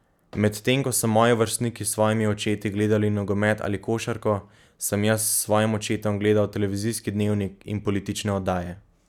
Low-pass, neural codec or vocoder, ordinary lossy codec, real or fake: 19.8 kHz; none; none; real